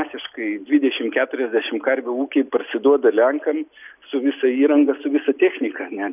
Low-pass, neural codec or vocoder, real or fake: 3.6 kHz; none; real